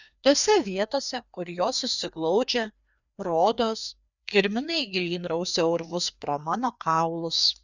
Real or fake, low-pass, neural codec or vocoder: fake; 7.2 kHz; codec, 16 kHz, 2 kbps, FreqCodec, larger model